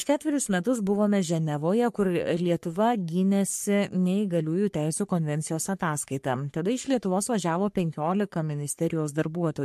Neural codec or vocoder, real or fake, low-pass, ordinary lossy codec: codec, 44.1 kHz, 3.4 kbps, Pupu-Codec; fake; 14.4 kHz; MP3, 64 kbps